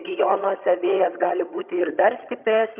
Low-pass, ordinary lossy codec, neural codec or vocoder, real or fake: 3.6 kHz; Opus, 64 kbps; vocoder, 22.05 kHz, 80 mel bands, HiFi-GAN; fake